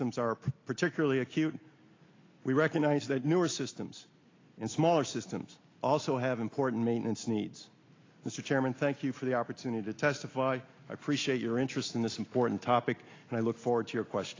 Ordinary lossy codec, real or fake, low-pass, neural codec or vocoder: AAC, 32 kbps; real; 7.2 kHz; none